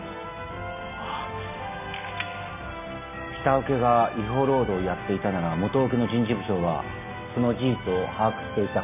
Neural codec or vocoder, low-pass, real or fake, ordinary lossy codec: none; 3.6 kHz; real; none